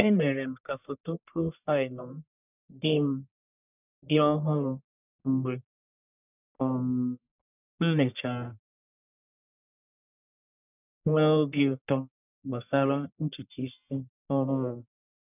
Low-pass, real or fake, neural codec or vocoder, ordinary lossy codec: 3.6 kHz; fake; codec, 44.1 kHz, 1.7 kbps, Pupu-Codec; none